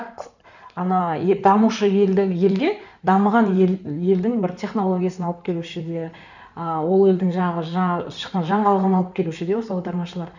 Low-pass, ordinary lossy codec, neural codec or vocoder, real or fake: 7.2 kHz; none; codec, 16 kHz in and 24 kHz out, 2.2 kbps, FireRedTTS-2 codec; fake